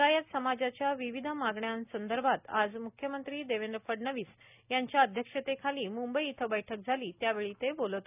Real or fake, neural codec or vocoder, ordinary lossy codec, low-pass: real; none; none; 3.6 kHz